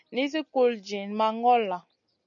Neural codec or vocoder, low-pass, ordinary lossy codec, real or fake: none; 7.2 kHz; MP3, 96 kbps; real